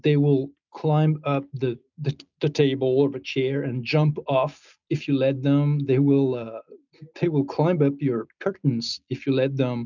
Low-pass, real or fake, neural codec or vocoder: 7.2 kHz; real; none